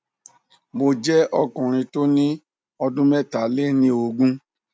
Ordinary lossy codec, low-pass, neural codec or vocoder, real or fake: none; none; none; real